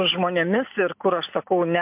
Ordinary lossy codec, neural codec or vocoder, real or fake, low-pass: MP3, 32 kbps; none; real; 3.6 kHz